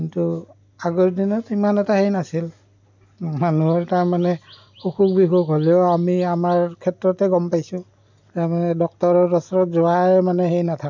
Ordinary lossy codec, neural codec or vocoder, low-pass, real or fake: MP3, 64 kbps; none; 7.2 kHz; real